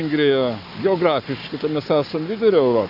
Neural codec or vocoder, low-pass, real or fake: codec, 44.1 kHz, 7.8 kbps, Pupu-Codec; 5.4 kHz; fake